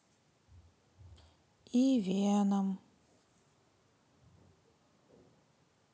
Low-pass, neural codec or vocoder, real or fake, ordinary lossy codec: none; none; real; none